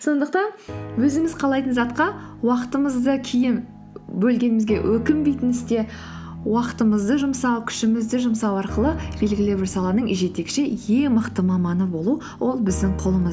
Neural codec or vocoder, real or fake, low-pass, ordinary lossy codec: none; real; none; none